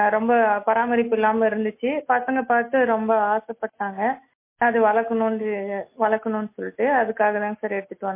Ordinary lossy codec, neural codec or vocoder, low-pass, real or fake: MP3, 24 kbps; none; 3.6 kHz; real